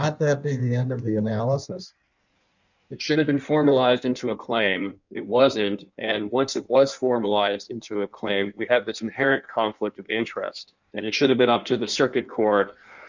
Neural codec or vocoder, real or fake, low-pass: codec, 16 kHz in and 24 kHz out, 1.1 kbps, FireRedTTS-2 codec; fake; 7.2 kHz